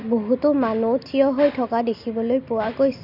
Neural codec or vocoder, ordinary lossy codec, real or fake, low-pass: none; none; real; 5.4 kHz